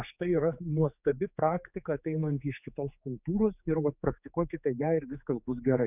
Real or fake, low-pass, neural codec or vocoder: fake; 3.6 kHz; codec, 16 kHz, 4 kbps, X-Codec, HuBERT features, trained on general audio